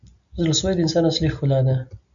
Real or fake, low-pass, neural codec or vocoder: real; 7.2 kHz; none